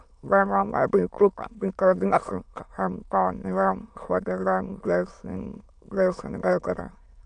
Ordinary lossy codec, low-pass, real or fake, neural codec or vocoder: none; 9.9 kHz; fake; autoencoder, 22.05 kHz, a latent of 192 numbers a frame, VITS, trained on many speakers